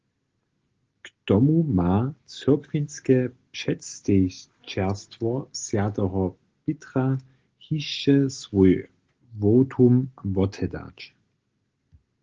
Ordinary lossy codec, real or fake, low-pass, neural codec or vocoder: Opus, 16 kbps; real; 7.2 kHz; none